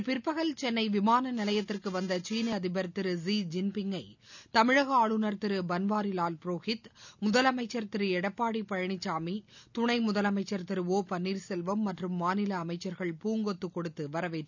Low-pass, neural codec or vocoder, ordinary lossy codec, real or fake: 7.2 kHz; none; none; real